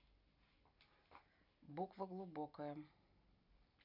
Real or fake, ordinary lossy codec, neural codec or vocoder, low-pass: real; none; none; 5.4 kHz